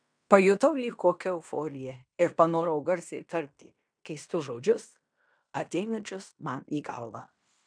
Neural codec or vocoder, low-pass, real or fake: codec, 16 kHz in and 24 kHz out, 0.9 kbps, LongCat-Audio-Codec, fine tuned four codebook decoder; 9.9 kHz; fake